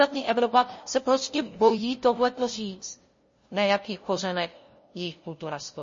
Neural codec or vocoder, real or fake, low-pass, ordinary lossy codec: codec, 16 kHz, 0.5 kbps, FunCodec, trained on LibriTTS, 25 frames a second; fake; 7.2 kHz; MP3, 32 kbps